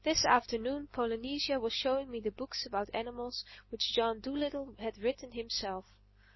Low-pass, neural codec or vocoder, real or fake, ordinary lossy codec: 7.2 kHz; none; real; MP3, 24 kbps